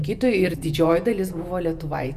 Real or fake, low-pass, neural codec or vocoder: fake; 14.4 kHz; vocoder, 48 kHz, 128 mel bands, Vocos